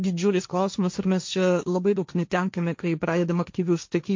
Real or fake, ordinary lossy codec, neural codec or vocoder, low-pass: fake; MP3, 48 kbps; codec, 16 kHz, 1.1 kbps, Voila-Tokenizer; 7.2 kHz